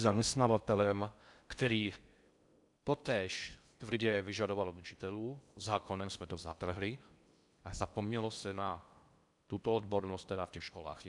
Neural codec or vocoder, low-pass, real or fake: codec, 16 kHz in and 24 kHz out, 0.6 kbps, FocalCodec, streaming, 4096 codes; 10.8 kHz; fake